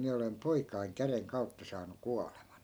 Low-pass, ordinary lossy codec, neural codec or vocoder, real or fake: none; none; none; real